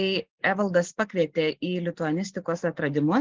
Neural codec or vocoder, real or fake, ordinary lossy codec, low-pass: none; real; Opus, 16 kbps; 7.2 kHz